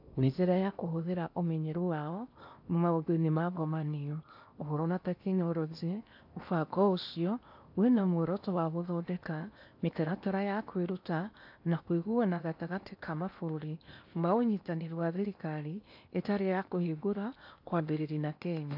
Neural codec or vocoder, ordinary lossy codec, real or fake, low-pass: codec, 16 kHz in and 24 kHz out, 0.8 kbps, FocalCodec, streaming, 65536 codes; AAC, 48 kbps; fake; 5.4 kHz